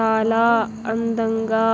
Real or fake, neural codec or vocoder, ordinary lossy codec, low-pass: real; none; none; none